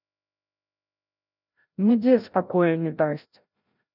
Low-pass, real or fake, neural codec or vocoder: 5.4 kHz; fake; codec, 16 kHz, 0.5 kbps, FreqCodec, larger model